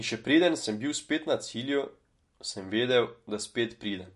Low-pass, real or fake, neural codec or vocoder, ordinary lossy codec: 14.4 kHz; real; none; MP3, 48 kbps